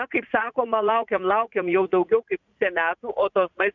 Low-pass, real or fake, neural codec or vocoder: 7.2 kHz; fake; vocoder, 44.1 kHz, 80 mel bands, Vocos